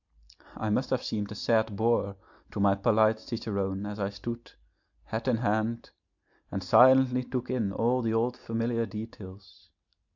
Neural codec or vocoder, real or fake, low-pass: none; real; 7.2 kHz